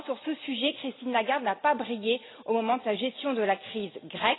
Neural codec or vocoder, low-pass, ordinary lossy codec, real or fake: none; 7.2 kHz; AAC, 16 kbps; real